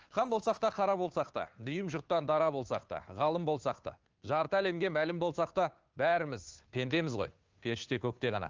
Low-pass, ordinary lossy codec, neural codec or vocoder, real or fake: 7.2 kHz; Opus, 24 kbps; codec, 16 kHz, 2 kbps, FunCodec, trained on Chinese and English, 25 frames a second; fake